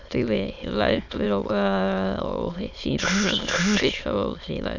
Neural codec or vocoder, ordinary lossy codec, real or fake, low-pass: autoencoder, 22.05 kHz, a latent of 192 numbers a frame, VITS, trained on many speakers; none; fake; 7.2 kHz